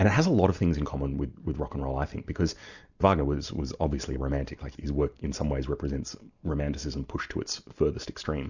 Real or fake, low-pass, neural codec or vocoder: real; 7.2 kHz; none